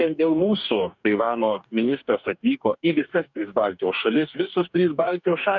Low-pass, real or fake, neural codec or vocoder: 7.2 kHz; fake; codec, 44.1 kHz, 2.6 kbps, DAC